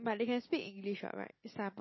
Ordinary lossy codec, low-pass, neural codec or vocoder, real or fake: MP3, 24 kbps; 7.2 kHz; none; real